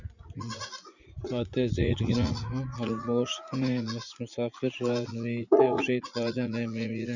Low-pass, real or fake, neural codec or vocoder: 7.2 kHz; fake; vocoder, 44.1 kHz, 80 mel bands, Vocos